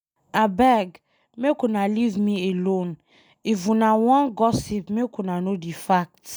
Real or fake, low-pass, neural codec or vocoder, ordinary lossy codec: real; none; none; none